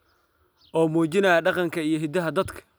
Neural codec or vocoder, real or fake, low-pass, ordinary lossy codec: none; real; none; none